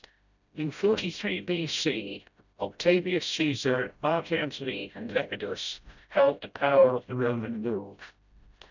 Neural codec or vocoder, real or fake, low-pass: codec, 16 kHz, 0.5 kbps, FreqCodec, smaller model; fake; 7.2 kHz